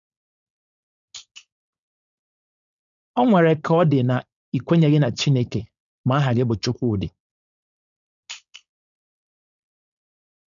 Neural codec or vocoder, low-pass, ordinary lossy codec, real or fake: codec, 16 kHz, 4.8 kbps, FACodec; 7.2 kHz; none; fake